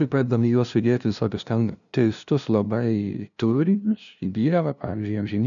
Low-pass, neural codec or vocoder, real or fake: 7.2 kHz; codec, 16 kHz, 0.5 kbps, FunCodec, trained on LibriTTS, 25 frames a second; fake